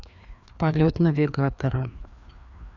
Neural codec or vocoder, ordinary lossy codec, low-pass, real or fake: codec, 16 kHz, 2 kbps, FreqCodec, larger model; none; 7.2 kHz; fake